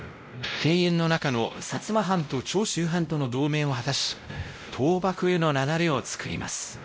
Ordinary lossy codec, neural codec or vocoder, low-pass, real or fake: none; codec, 16 kHz, 0.5 kbps, X-Codec, WavLM features, trained on Multilingual LibriSpeech; none; fake